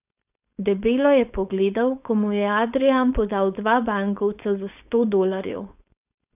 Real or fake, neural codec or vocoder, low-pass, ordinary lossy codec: fake; codec, 16 kHz, 4.8 kbps, FACodec; 3.6 kHz; none